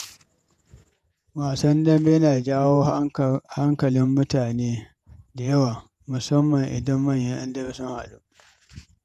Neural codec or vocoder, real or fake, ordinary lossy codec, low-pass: vocoder, 48 kHz, 128 mel bands, Vocos; fake; none; 14.4 kHz